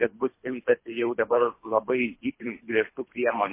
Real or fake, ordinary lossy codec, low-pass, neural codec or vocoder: fake; MP3, 24 kbps; 3.6 kHz; codec, 24 kHz, 3 kbps, HILCodec